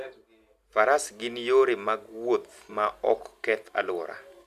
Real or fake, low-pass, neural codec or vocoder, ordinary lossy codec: real; 14.4 kHz; none; Opus, 64 kbps